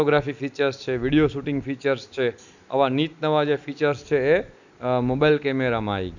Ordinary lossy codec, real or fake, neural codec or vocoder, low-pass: none; real; none; 7.2 kHz